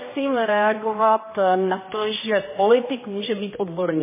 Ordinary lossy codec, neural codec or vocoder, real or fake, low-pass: MP3, 16 kbps; codec, 16 kHz, 1 kbps, X-Codec, HuBERT features, trained on general audio; fake; 3.6 kHz